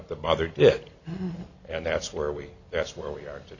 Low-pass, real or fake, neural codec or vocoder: 7.2 kHz; real; none